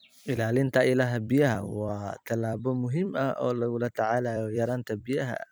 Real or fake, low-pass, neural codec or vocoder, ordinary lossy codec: fake; none; vocoder, 44.1 kHz, 128 mel bands every 256 samples, BigVGAN v2; none